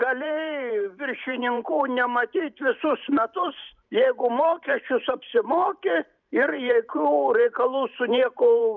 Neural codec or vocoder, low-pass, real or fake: vocoder, 44.1 kHz, 128 mel bands every 512 samples, BigVGAN v2; 7.2 kHz; fake